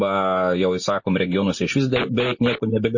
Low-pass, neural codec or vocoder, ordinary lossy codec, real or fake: 7.2 kHz; none; MP3, 32 kbps; real